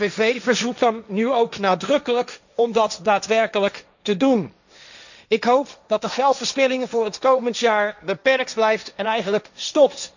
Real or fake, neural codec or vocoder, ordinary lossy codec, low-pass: fake; codec, 16 kHz, 1.1 kbps, Voila-Tokenizer; none; 7.2 kHz